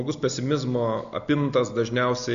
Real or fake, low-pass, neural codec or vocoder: real; 7.2 kHz; none